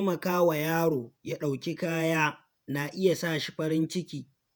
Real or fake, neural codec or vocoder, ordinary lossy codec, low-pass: fake; vocoder, 48 kHz, 128 mel bands, Vocos; none; none